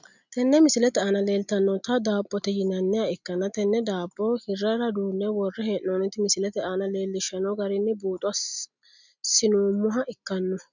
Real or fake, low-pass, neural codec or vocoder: real; 7.2 kHz; none